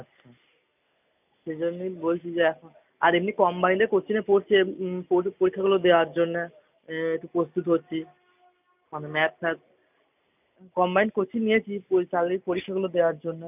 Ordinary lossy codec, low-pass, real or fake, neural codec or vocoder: none; 3.6 kHz; real; none